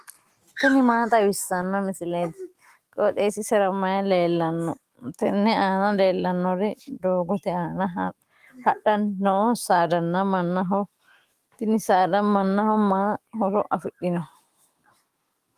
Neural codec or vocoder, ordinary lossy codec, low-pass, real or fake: autoencoder, 48 kHz, 128 numbers a frame, DAC-VAE, trained on Japanese speech; Opus, 24 kbps; 14.4 kHz; fake